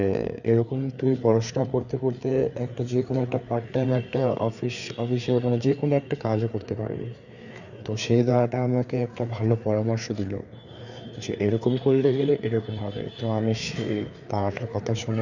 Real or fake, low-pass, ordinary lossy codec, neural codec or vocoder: fake; 7.2 kHz; none; codec, 16 kHz, 4 kbps, FreqCodec, larger model